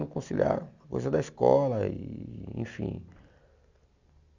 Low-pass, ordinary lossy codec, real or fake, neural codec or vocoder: 7.2 kHz; Opus, 64 kbps; real; none